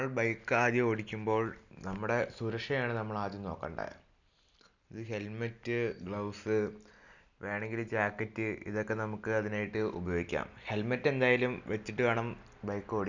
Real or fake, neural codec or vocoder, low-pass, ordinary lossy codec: real; none; 7.2 kHz; none